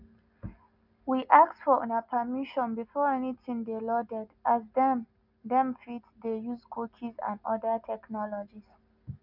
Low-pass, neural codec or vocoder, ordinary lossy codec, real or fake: 5.4 kHz; none; none; real